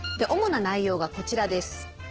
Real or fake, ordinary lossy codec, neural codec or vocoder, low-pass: fake; Opus, 16 kbps; codec, 16 kHz, 6 kbps, DAC; 7.2 kHz